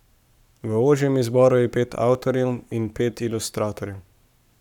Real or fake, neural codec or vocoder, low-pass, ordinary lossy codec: fake; codec, 44.1 kHz, 7.8 kbps, Pupu-Codec; 19.8 kHz; none